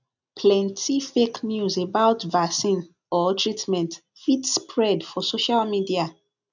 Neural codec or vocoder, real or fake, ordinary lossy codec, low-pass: none; real; none; 7.2 kHz